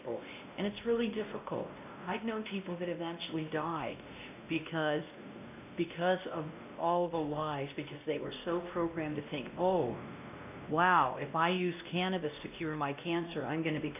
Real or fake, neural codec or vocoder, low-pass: fake; codec, 16 kHz, 1 kbps, X-Codec, WavLM features, trained on Multilingual LibriSpeech; 3.6 kHz